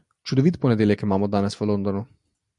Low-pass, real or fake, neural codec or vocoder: 10.8 kHz; real; none